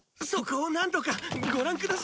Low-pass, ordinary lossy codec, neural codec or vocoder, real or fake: none; none; none; real